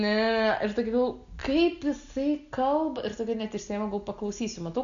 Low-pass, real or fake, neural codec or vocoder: 7.2 kHz; real; none